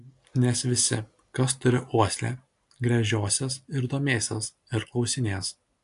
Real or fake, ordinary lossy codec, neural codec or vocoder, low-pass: real; AAC, 64 kbps; none; 10.8 kHz